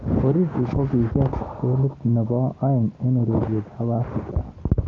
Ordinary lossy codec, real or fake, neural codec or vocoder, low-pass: Opus, 32 kbps; real; none; 7.2 kHz